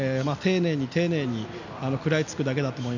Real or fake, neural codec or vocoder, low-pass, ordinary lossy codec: real; none; 7.2 kHz; none